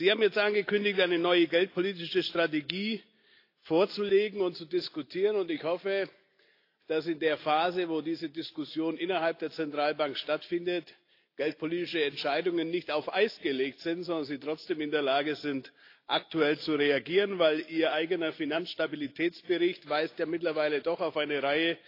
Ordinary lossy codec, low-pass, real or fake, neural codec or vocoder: AAC, 32 kbps; 5.4 kHz; real; none